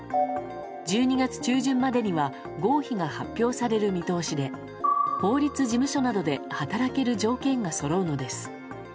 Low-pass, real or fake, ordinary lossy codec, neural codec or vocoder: none; real; none; none